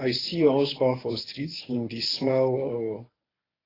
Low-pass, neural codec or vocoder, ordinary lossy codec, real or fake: 5.4 kHz; codec, 24 kHz, 0.9 kbps, WavTokenizer, medium speech release version 1; AAC, 24 kbps; fake